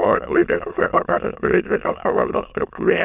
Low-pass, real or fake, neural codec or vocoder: 3.6 kHz; fake; autoencoder, 22.05 kHz, a latent of 192 numbers a frame, VITS, trained on many speakers